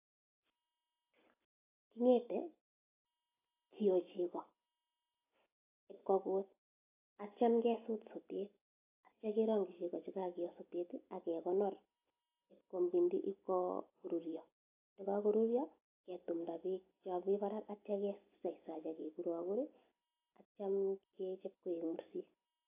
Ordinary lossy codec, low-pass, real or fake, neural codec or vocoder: AAC, 24 kbps; 3.6 kHz; real; none